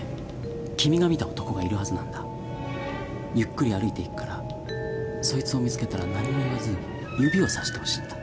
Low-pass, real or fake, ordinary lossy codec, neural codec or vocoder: none; real; none; none